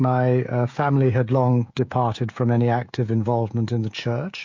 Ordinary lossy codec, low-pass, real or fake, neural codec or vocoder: MP3, 48 kbps; 7.2 kHz; fake; codec, 16 kHz, 16 kbps, FreqCodec, smaller model